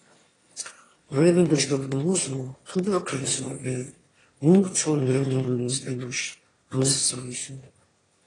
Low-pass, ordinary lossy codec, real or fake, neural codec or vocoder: 9.9 kHz; AAC, 32 kbps; fake; autoencoder, 22.05 kHz, a latent of 192 numbers a frame, VITS, trained on one speaker